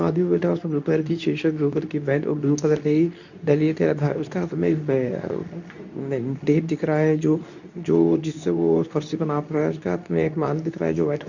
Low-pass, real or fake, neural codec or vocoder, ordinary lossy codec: 7.2 kHz; fake; codec, 24 kHz, 0.9 kbps, WavTokenizer, medium speech release version 2; none